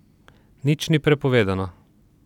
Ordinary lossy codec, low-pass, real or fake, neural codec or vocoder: none; 19.8 kHz; real; none